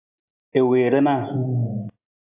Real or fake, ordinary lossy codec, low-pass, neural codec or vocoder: real; AAC, 24 kbps; 3.6 kHz; none